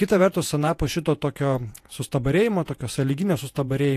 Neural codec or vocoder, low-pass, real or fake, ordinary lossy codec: vocoder, 48 kHz, 128 mel bands, Vocos; 14.4 kHz; fake; AAC, 64 kbps